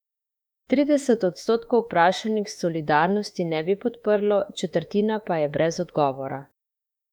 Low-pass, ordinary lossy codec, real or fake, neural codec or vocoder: 19.8 kHz; none; fake; autoencoder, 48 kHz, 32 numbers a frame, DAC-VAE, trained on Japanese speech